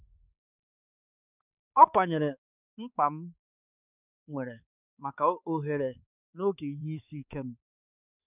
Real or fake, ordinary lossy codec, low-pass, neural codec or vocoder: fake; none; 3.6 kHz; codec, 16 kHz, 4 kbps, X-Codec, HuBERT features, trained on balanced general audio